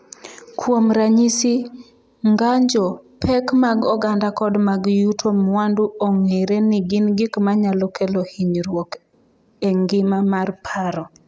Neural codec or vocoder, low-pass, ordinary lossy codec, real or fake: none; none; none; real